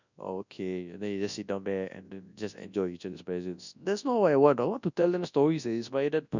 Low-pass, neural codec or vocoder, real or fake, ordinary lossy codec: 7.2 kHz; codec, 24 kHz, 0.9 kbps, WavTokenizer, large speech release; fake; none